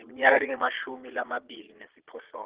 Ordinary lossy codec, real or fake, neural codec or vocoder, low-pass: Opus, 16 kbps; fake; vocoder, 22.05 kHz, 80 mel bands, Vocos; 3.6 kHz